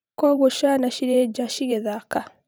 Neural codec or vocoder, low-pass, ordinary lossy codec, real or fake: vocoder, 44.1 kHz, 128 mel bands every 512 samples, BigVGAN v2; none; none; fake